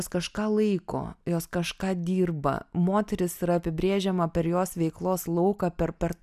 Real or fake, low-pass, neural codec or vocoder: real; 14.4 kHz; none